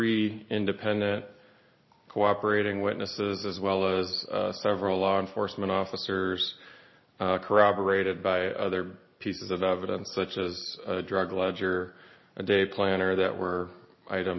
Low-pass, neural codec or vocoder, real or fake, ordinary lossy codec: 7.2 kHz; none; real; MP3, 24 kbps